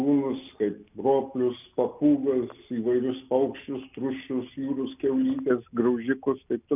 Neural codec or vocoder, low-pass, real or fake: none; 3.6 kHz; real